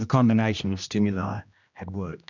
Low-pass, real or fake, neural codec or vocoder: 7.2 kHz; fake; codec, 16 kHz, 1 kbps, X-Codec, HuBERT features, trained on general audio